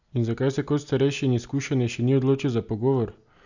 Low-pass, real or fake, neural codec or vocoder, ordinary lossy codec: 7.2 kHz; real; none; none